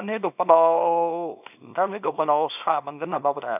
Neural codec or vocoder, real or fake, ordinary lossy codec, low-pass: codec, 24 kHz, 0.9 kbps, WavTokenizer, small release; fake; none; 3.6 kHz